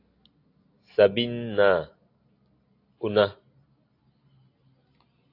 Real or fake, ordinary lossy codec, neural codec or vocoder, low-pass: real; AAC, 32 kbps; none; 5.4 kHz